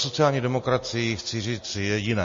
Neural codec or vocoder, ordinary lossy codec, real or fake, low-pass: none; MP3, 32 kbps; real; 7.2 kHz